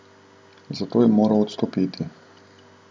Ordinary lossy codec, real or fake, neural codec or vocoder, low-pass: none; real; none; none